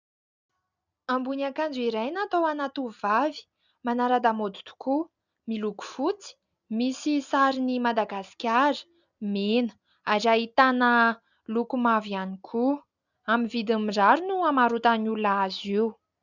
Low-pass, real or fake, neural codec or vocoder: 7.2 kHz; real; none